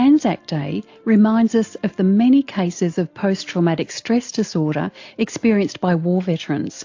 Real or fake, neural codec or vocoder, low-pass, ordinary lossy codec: real; none; 7.2 kHz; AAC, 48 kbps